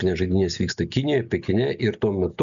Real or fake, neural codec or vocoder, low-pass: real; none; 7.2 kHz